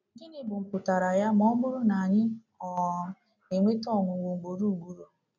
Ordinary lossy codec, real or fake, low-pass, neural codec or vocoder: none; real; 7.2 kHz; none